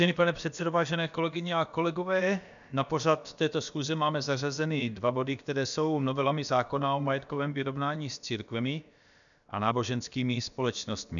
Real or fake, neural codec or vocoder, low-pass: fake; codec, 16 kHz, about 1 kbps, DyCAST, with the encoder's durations; 7.2 kHz